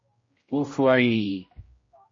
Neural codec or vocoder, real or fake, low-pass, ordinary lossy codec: codec, 16 kHz, 0.5 kbps, X-Codec, HuBERT features, trained on general audio; fake; 7.2 kHz; MP3, 32 kbps